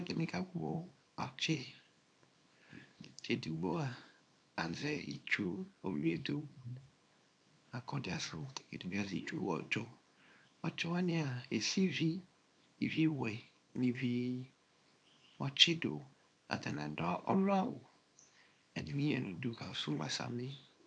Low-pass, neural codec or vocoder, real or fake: 9.9 kHz; codec, 24 kHz, 0.9 kbps, WavTokenizer, small release; fake